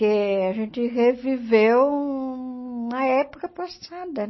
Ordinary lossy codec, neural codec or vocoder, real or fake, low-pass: MP3, 24 kbps; none; real; 7.2 kHz